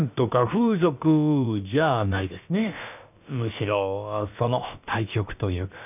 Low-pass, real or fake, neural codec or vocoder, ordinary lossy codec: 3.6 kHz; fake; codec, 16 kHz, about 1 kbps, DyCAST, with the encoder's durations; none